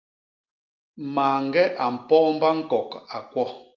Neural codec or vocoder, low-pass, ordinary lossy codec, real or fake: none; 7.2 kHz; Opus, 24 kbps; real